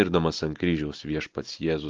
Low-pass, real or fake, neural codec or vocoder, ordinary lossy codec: 7.2 kHz; real; none; Opus, 32 kbps